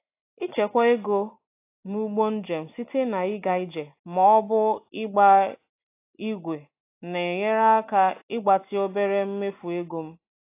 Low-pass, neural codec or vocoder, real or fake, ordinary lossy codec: 3.6 kHz; none; real; AAC, 24 kbps